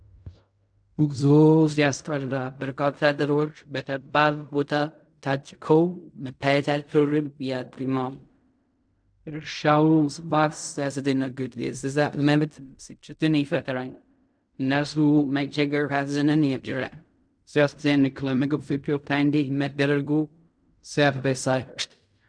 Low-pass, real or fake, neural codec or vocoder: 9.9 kHz; fake; codec, 16 kHz in and 24 kHz out, 0.4 kbps, LongCat-Audio-Codec, fine tuned four codebook decoder